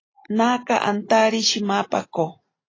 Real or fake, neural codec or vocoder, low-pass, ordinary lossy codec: real; none; 7.2 kHz; AAC, 32 kbps